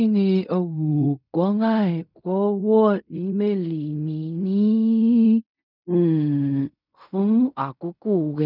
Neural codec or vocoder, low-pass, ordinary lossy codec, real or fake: codec, 16 kHz in and 24 kHz out, 0.4 kbps, LongCat-Audio-Codec, fine tuned four codebook decoder; 5.4 kHz; none; fake